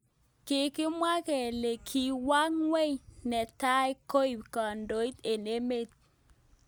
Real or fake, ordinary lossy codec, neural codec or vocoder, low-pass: real; none; none; none